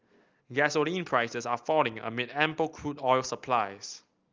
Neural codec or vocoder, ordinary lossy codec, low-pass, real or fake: none; Opus, 24 kbps; 7.2 kHz; real